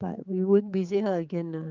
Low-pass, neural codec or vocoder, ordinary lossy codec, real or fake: 7.2 kHz; codec, 16 kHz, 4 kbps, X-Codec, HuBERT features, trained on general audio; Opus, 24 kbps; fake